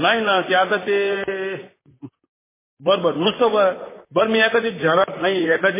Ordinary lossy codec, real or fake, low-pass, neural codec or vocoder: MP3, 16 kbps; fake; 3.6 kHz; vocoder, 44.1 kHz, 128 mel bands, Pupu-Vocoder